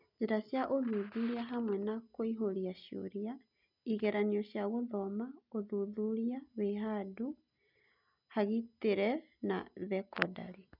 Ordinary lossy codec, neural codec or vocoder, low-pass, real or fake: none; none; 5.4 kHz; real